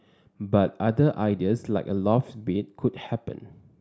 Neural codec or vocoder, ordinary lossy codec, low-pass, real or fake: none; none; none; real